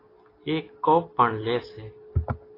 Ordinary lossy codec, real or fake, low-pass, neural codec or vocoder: AAC, 24 kbps; real; 5.4 kHz; none